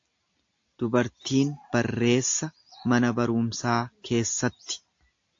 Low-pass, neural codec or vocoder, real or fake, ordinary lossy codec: 7.2 kHz; none; real; AAC, 48 kbps